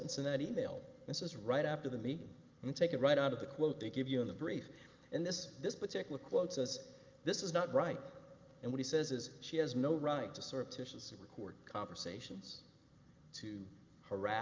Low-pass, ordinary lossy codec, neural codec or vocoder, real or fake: 7.2 kHz; Opus, 24 kbps; none; real